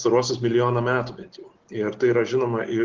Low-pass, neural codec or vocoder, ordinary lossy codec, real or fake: 7.2 kHz; none; Opus, 16 kbps; real